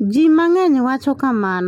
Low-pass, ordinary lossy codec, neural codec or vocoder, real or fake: 19.8 kHz; MP3, 64 kbps; none; real